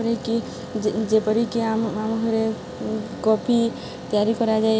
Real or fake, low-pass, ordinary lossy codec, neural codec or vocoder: real; none; none; none